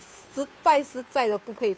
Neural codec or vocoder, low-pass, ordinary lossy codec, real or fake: codec, 16 kHz, 2 kbps, FunCodec, trained on Chinese and English, 25 frames a second; none; none; fake